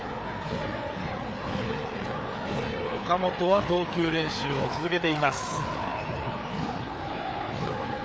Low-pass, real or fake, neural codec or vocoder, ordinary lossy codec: none; fake; codec, 16 kHz, 4 kbps, FreqCodec, larger model; none